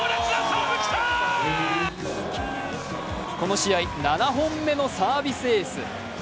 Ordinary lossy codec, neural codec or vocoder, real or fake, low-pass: none; none; real; none